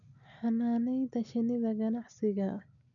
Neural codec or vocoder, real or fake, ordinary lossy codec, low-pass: none; real; none; 7.2 kHz